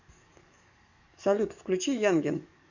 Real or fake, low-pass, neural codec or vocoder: fake; 7.2 kHz; codec, 44.1 kHz, 7.8 kbps, DAC